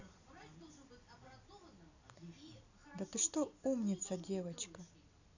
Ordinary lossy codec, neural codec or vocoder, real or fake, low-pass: none; none; real; 7.2 kHz